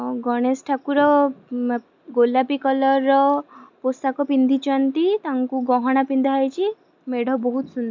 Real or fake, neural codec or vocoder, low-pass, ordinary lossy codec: real; none; 7.2 kHz; MP3, 64 kbps